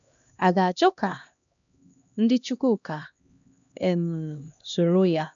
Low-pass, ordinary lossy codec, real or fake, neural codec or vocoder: 7.2 kHz; none; fake; codec, 16 kHz, 1 kbps, X-Codec, HuBERT features, trained on LibriSpeech